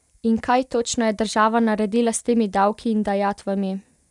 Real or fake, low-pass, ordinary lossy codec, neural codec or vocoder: real; 10.8 kHz; none; none